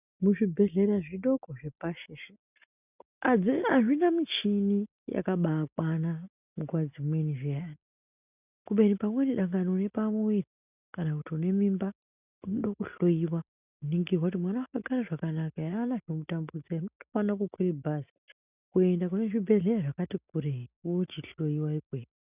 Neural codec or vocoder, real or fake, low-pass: none; real; 3.6 kHz